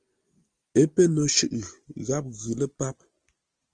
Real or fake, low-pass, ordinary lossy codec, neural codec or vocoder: real; 9.9 kHz; Opus, 24 kbps; none